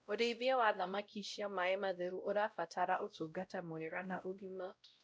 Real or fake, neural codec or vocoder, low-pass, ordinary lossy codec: fake; codec, 16 kHz, 0.5 kbps, X-Codec, WavLM features, trained on Multilingual LibriSpeech; none; none